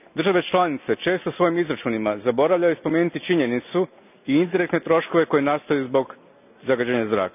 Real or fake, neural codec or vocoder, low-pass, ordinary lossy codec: real; none; 3.6 kHz; none